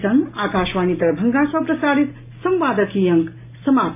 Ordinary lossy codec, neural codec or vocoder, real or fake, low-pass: MP3, 24 kbps; none; real; 3.6 kHz